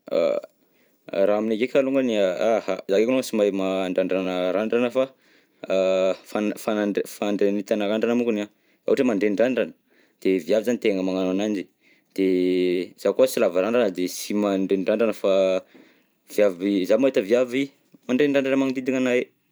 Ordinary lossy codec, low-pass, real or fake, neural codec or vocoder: none; none; fake; vocoder, 44.1 kHz, 128 mel bands every 512 samples, BigVGAN v2